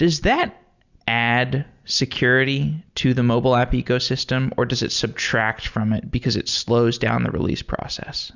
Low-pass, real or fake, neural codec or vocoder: 7.2 kHz; real; none